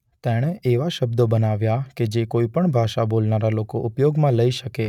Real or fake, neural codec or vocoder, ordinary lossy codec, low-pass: real; none; none; 19.8 kHz